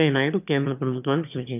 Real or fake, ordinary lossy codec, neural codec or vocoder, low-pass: fake; none; autoencoder, 22.05 kHz, a latent of 192 numbers a frame, VITS, trained on one speaker; 3.6 kHz